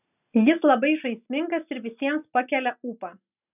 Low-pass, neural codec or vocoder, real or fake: 3.6 kHz; none; real